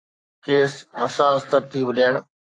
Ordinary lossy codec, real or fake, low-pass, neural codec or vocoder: AAC, 48 kbps; fake; 9.9 kHz; codec, 44.1 kHz, 3.4 kbps, Pupu-Codec